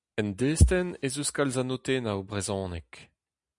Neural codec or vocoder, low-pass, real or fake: none; 10.8 kHz; real